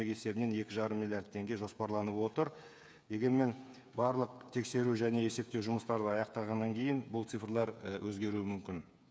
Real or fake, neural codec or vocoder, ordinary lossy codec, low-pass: fake; codec, 16 kHz, 8 kbps, FreqCodec, smaller model; none; none